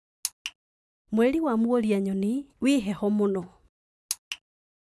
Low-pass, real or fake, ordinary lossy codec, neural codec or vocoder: none; real; none; none